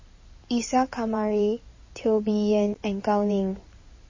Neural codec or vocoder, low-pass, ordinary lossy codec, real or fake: codec, 16 kHz in and 24 kHz out, 2.2 kbps, FireRedTTS-2 codec; 7.2 kHz; MP3, 32 kbps; fake